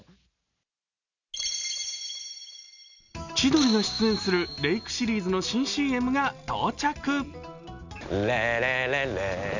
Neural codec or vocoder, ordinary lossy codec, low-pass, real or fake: none; none; 7.2 kHz; real